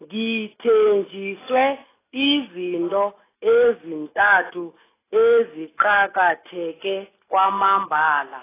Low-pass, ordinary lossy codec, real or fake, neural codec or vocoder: 3.6 kHz; AAC, 16 kbps; real; none